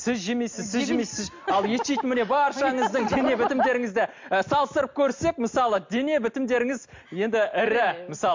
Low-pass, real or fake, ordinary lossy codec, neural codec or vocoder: 7.2 kHz; real; MP3, 48 kbps; none